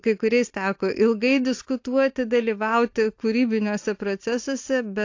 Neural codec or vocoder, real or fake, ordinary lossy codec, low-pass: none; real; AAC, 48 kbps; 7.2 kHz